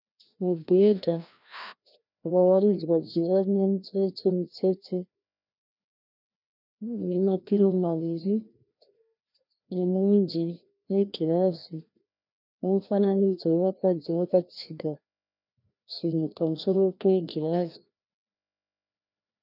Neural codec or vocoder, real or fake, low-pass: codec, 16 kHz, 1 kbps, FreqCodec, larger model; fake; 5.4 kHz